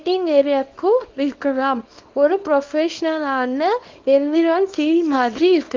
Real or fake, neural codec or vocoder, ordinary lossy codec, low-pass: fake; codec, 24 kHz, 0.9 kbps, WavTokenizer, small release; Opus, 32 kbps; 7.2 kHz